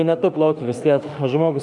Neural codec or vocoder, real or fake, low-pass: autoencoder, 48 kHz, 32 numbers a frame, DAC-VAE, trained on Japanese speech; fake; 10.8 kHz